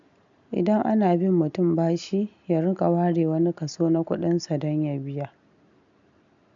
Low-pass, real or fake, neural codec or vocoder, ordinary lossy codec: 7.2 kHz; real; none; MP3, 96 kbps